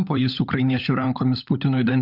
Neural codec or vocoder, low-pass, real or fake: codec, 16 kHz, 16 kbps, FunCodec, trained on LibriTTS, 50 frames a second; 5.4 kHz; fake